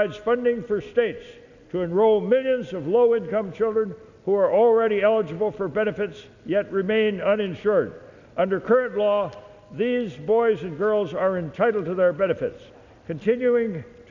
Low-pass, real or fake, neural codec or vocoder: 7.2 kHz; real; none